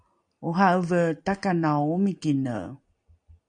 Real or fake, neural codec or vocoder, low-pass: real; none; 9.9 kHz